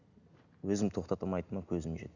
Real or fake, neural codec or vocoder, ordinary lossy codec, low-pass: real; none; none; 7.2 kHz